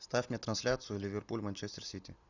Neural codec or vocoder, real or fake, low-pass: none; real; 7.2 kHz